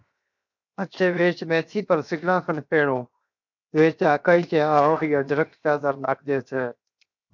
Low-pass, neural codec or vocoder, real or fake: 7.2 kHz; codec, 16 kHz, 0.7 kbps, FocalCodec; fake